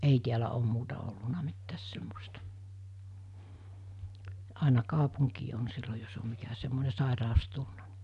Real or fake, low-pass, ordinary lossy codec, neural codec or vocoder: real; 10.8 kHz; none; none